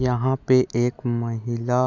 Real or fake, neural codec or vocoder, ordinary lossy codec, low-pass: real; none; none; 7.2 kHz